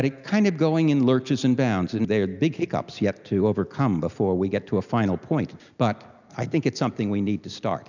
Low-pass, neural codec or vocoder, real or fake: 7.2 kHz; none; real